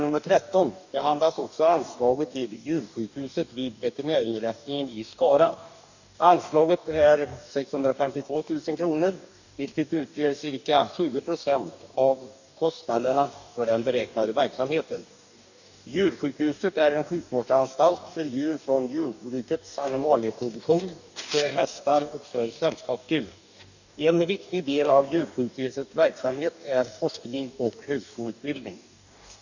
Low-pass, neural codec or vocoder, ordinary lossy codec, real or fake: 7.2 kHz; codec, 44.1 kHz, 2.6 kbps, DAC; none; fake